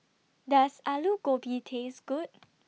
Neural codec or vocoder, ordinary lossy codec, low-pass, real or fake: none; none; none; real